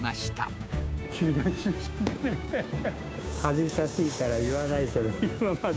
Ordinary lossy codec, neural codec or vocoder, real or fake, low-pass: none; codec, 16 kHz, 6 kbps, DAC; fake; none